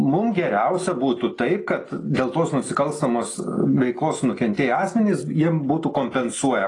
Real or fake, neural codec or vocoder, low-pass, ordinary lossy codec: real; none; 10.8 kHz; AAC, 32 kbps